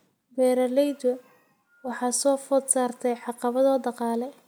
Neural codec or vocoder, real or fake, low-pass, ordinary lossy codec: none; real; none; none